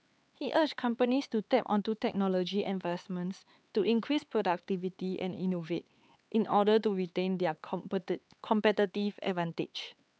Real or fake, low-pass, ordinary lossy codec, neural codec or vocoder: fake; none; none; codec, 16 kHz, 4 kbps, X-Codec, HuBERT features, trained on LibriSpeech